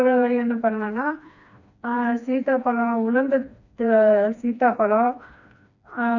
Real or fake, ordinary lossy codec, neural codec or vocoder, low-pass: fake; none; codec, 16 kHz, 2 kbps, FreqCodec, smaller model; 7.2 kHz